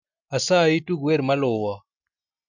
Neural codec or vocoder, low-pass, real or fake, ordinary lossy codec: none; 7.2 kHz; real; none